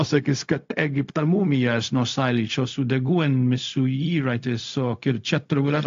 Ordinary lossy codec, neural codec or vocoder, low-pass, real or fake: AAC, 48 kbps; codec, 16 kHz, 0.4 kbps, LongCat-Audio-Codec; 7.2 kHz; fake